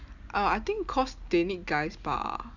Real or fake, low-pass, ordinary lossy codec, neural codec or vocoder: real; 7.2 kHz; none; none